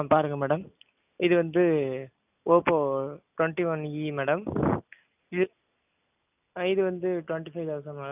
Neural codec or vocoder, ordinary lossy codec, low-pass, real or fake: none; none; 3.6 kHz; real